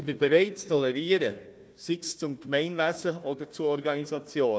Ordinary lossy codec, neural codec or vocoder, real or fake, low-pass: none; codec, 16 kHz, 1 kbps, FunCodec, trained on Chinese and English, 50 frames a second; fake; none